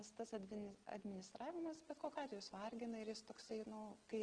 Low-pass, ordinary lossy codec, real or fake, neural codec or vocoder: 9.9 kHz; Opus, 16 kbps; real; none